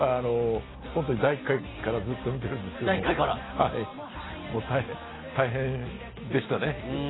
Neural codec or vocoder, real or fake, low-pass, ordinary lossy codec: none; real; 7.2 kHz; AAC, 16 kbps